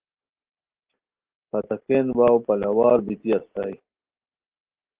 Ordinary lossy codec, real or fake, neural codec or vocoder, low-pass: Opus, 16 kbps; real; none; 3.6 kHz